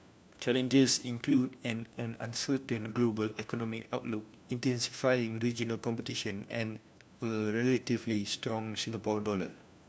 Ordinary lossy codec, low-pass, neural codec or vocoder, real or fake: none; none; codec, 16 kHz, 1 kbps, FunCodec, trained on LibriTTS, 50 frames a second; fake